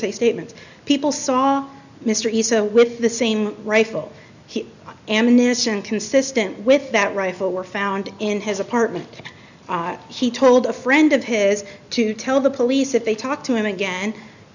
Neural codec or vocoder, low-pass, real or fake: none; 7.2 kHz; real